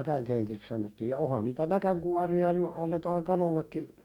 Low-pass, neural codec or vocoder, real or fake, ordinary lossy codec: 19.8 kHz; codec, 44.1 kHz, 2.6 kbps, DAC; fake; none